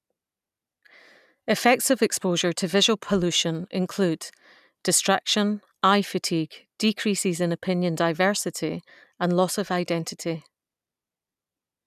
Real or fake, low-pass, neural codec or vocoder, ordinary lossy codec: real; 14.4 kHz; none; none